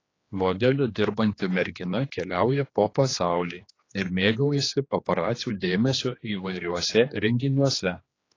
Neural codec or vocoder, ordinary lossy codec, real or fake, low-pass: codec, 16 kHz, 2 kbps, X-Codec, HuBERT features, trained on general audio; AAC, 32 kbps; fake; 7.2 kHz